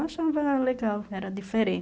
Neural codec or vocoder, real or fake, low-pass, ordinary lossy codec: none; real; none; none